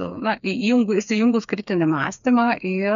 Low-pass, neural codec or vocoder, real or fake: 7.2 kHz; codec, 16 kHz, 4 kbps, FreqCodec, smaller model; fake